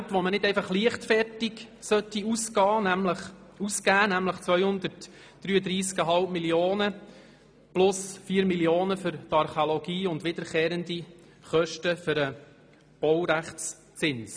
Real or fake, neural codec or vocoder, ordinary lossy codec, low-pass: real; none; none; none